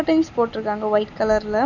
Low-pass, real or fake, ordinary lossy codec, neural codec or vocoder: 7.2 kHz; real; none; none